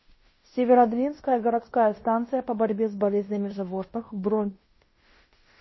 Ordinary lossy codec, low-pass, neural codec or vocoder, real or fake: MP3, 24 kbps; 7.2 kHz; codec, 16 kHz in and 24 kHz out, 0.9 kbps, LongCat-Audio-Codec, fine tuned four codebook decoder; fake